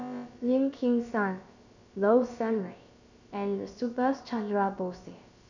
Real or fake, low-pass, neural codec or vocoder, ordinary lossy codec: fake; 7.2 kHz; codec, 16 kHz, about 1 kbps, DyCAST, with the encoder's durations; none